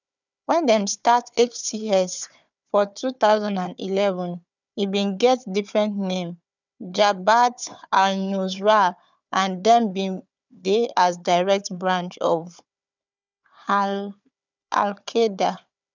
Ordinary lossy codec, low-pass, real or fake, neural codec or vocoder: none; 7.2 kHz; fake; codec, 16 kHz, 4 kbps, FunCodec, trained on Chinese and English, 50 frames a second